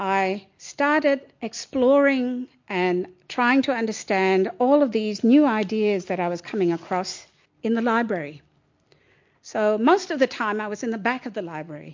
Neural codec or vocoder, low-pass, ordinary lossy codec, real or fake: none; 7.2 kHz; MP3, 48 kbps; real